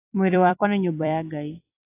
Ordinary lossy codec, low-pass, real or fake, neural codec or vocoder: AAC, 24 kbps; 3.6 kHz; fake; vocoder, 44.1 kHz, 128 mel bands every 256 samples, BigVGAN v2